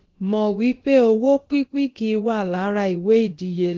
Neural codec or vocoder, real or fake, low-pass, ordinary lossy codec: codec, 16 kHz, about 1 kbps, DyCAST, with the encoder's durations; fake; 7.2 kHz; Opus, 16 kbps